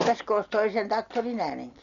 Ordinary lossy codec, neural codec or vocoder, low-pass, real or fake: none; none; 7.2 kHz; real